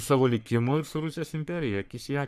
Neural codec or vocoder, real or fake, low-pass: codec, 44.1 kHz, 3.4 kbps, Pupu-Codec; fake; 14.4 kHz